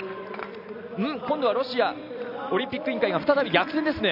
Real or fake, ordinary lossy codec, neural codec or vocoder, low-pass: real; none; none; 5.4 kHz